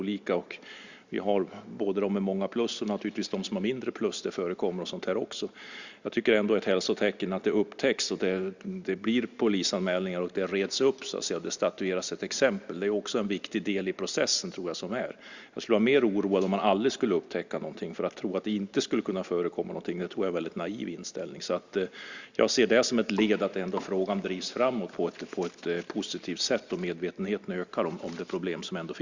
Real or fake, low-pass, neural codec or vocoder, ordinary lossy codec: real; 7.2 kHz; none; Opus, 64 kbps